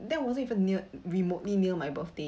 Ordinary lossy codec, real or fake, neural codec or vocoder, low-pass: none; real; none; none